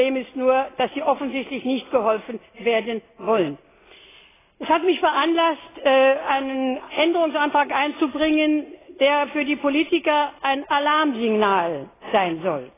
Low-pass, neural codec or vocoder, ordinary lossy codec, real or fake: 3.6 kHz; none; AAC, 16 kbps; real